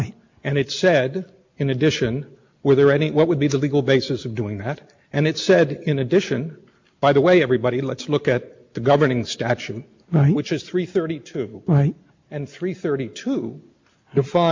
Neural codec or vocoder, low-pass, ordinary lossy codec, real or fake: none; 7.2 kHz; MP3, 48 kbps; real